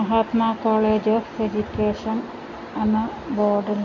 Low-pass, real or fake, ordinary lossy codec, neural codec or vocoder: 7.2 kHz; real; none; none